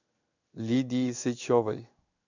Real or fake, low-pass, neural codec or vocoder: fake; 7.2 kHz; codec, 16 kHz in and 24 kHz out, 1 kbps, XY-Tokenizer